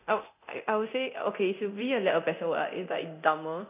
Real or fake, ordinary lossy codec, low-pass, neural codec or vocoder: fake; none; 3.6 kHz; codec, 24 kHz, 0.9 kbps, DualCodec